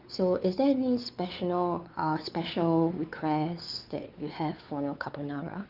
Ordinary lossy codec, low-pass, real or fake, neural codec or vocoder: Opus, 24 kbps; 5.4 kHz; fake; codec, 16 kHz, 4 kbps, X-Codec, WavLM features, trained on Multilingual LibriSpeech